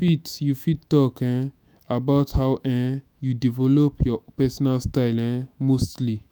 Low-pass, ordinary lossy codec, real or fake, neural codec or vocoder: none; none; fake; autoencoder, 48 kHz, 128 numbers a frame, DAC-VAE, trained on Japanese speech